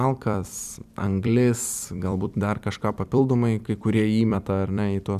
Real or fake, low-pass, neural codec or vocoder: fake; 14.4 kHz; vocoder, 44.1 kHz, 128 mel bands every 256 samples, BigVGAN v2